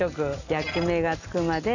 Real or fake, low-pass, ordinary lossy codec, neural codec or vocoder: real; 7.2 kHz; none; none